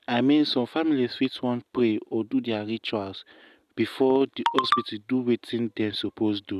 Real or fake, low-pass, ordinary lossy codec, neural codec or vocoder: real; 14.4 kHz; none; none